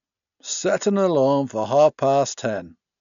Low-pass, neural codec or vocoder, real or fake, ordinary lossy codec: 7.2 kHz; none; real; none